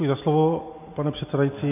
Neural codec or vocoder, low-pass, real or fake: none; 3.6 kHz; real